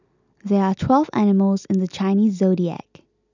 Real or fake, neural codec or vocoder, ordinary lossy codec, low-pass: real; none; none; 7.2 kHz